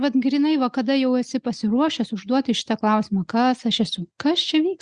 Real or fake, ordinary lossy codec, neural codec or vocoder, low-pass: real; Opus, 64 kbps; none; 9.9 kHz